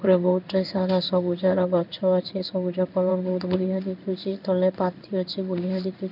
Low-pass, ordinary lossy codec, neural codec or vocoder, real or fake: 5.4 kHz; none; codec, 16 kHz in and 24 kHz out, 1 kbps, XY-Tokenizer; fake